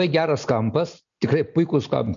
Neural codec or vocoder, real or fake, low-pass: none; real; 7.2 kHz